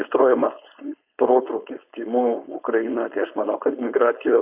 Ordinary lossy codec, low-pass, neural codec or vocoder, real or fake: Opus, 64 kbps; 3.6 kHz; codec, 16 kHz, 4.8 kbps, FACodec; fake